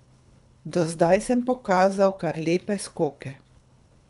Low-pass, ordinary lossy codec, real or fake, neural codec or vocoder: 10.8 kHz; none; fake; codec, 24 kHz, 3 kbps, HILCodec